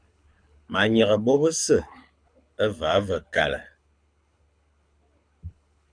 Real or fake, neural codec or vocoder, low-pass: fake; codec, 24 kHz, 6 kbps, HILCodec; 9.9 kHz